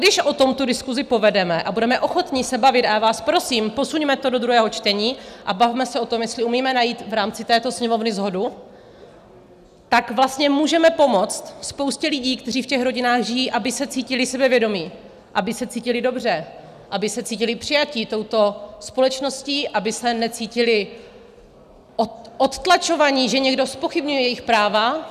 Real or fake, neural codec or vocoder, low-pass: real; none; 14.4 kHz